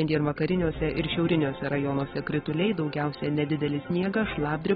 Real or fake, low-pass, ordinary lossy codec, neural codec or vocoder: real; 19.8 kHz; AAC, 16 kbps; none